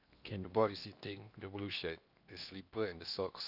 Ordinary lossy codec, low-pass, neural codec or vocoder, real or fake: none; 5.4 kHz; codec, 16 kHz in and 24 kHz out, 0.8 kbps, FocalCodec, streaming, 65536 codes; fake